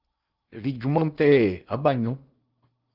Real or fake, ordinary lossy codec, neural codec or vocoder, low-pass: fake; Opus, 24 kbps; codec, 16 kHz in and 24 kHz out, 0.8 kbps, FocalCodec, streaming, 65536 codes; 5.4 kHz